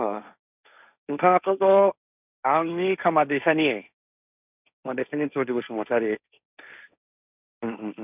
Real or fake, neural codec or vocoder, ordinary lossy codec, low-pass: fake; codec, 16 kHz, 1.1 kbps, Voila-Tokenizer; none; 3.6 kHz